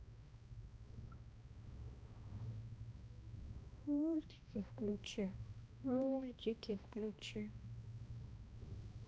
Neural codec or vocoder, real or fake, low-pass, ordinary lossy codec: codec, 16 kHz, 1 kbps, X-Codec, HuBERT features, trained on balanced general audio; fake; none; none